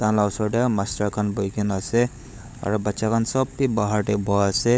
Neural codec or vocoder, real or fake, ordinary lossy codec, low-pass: codec, 16 kHz, 16 kbps, FunCodec, trained on LibriTTS, 50 frames a second; fake; none; none